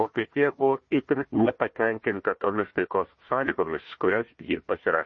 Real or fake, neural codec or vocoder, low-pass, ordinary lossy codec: fake; codec, 16 kHz, 1 kbps, FunCodec, trained on Chinese and English, 50 frames a second; 7.2 kHz; MP3, 32 kbps